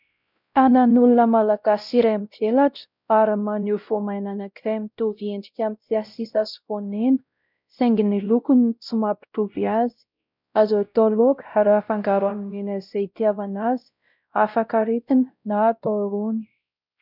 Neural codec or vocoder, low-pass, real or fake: codec, 16 kHz, 0.5 kbps, X-Codec, WavLM features, trained on Multilingual LibriSpeech; 5.4 kHz; fake